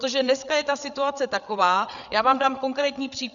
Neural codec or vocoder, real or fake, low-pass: codec, 16 kHz, 8 kbps, FreqCodec, larger model; fake; 7.2 kHz